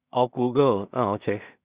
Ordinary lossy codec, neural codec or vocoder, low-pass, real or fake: Opus, 64 kbps; codec, 16 kHz in and 24 kHz out, 0.4 kbps, LongCat-Audio-Codec, two codebook decoder; 3.6 kHz; fake